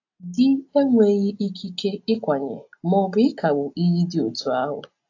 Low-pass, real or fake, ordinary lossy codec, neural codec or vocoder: 7.2 kHz; real; AAC, 48 kbps; none